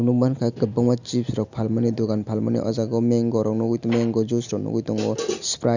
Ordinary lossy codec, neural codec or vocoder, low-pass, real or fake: none; none; 7.2 kHz; real